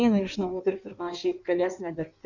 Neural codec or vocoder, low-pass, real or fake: codec, 16 kHz in and 24 kHz out, 1.1 kbps, FireRedTTS-2 codec; 7.2 kHz; fake